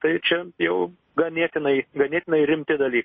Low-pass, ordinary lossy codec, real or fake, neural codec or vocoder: 7.2 kHz; MP3, 24 kbps; real; none